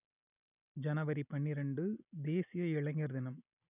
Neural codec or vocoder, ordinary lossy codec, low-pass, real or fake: none; none; 3.6 kHz; real